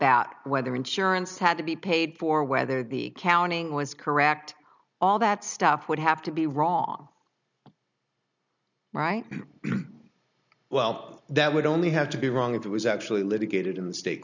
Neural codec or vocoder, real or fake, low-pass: none; real; 7.2 kHz